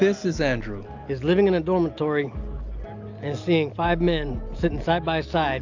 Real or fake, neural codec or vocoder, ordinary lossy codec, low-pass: real; none; AAC, 48 kbps; 7.2 kHz